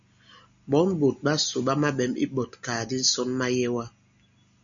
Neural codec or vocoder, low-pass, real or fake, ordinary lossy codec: none; 7.2 kHz; real; MP3, 64 kbps